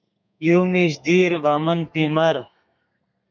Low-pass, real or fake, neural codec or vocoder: 7.2 kHz; fake; codec, 32 kHz, 1.9 kbps, SNAC